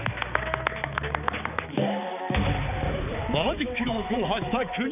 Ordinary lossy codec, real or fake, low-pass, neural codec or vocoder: none; fake; 3.6 kHz; codec, 16 kHz, 4 kbps, X-Codec, HuBERT features, trained on balanced general audio